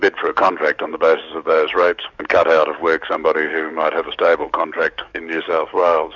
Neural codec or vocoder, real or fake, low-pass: codec, 44.1 kHz, 7.8 kbps, DAC; fake; 7.2 kHz